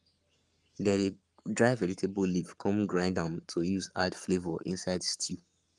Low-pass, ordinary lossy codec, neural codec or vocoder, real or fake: 10.8 kHz; Opus, 32 kbps; codec, 44.1 kHz, 7.8 kbps, Pupu-Codec; fake